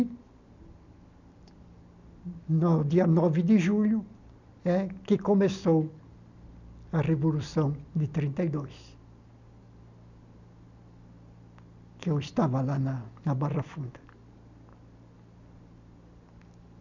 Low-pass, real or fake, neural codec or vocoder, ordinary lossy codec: 7.2 kHz; real; none; none